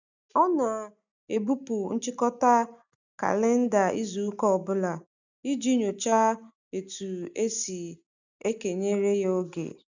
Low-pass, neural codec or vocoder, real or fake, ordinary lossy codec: 7.2 kHz; none; real; none